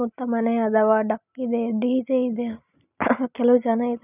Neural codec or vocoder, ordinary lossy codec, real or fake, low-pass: none; none; real; 3.6 kHz